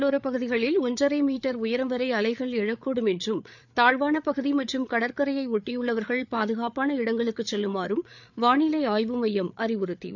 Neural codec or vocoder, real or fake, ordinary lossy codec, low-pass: codec, 16 kHz, 8 kbps, FreqCodec, larger model; fake; none; 7.2 kHz